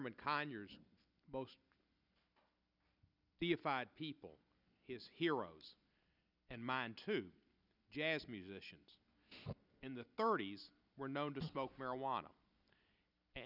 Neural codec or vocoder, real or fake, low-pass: none; real; 5.4 kHz